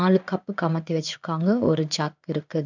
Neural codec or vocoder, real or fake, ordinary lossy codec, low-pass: codec, 16 kHz in and 24 kHz out, 1 kbps, XY-Tokenizer; fake; none; 7.2 kHz